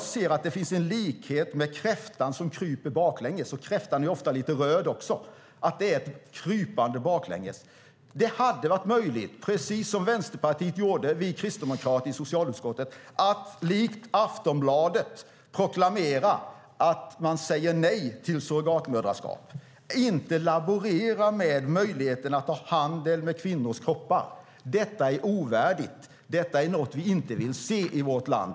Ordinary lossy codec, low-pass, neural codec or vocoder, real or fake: none; none; none; real